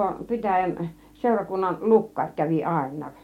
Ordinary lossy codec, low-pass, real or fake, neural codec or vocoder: MP3, 64 kbps; 19.8 kHz; real; none